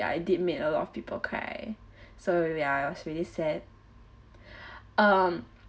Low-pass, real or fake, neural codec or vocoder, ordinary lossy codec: none; real; none; none